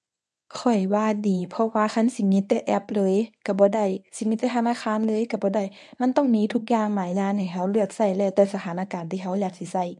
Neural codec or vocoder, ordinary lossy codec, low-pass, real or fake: codec, 24 kHz, 0.9 kbps, WavTokenizer, medium speech release version 1; none; none; fake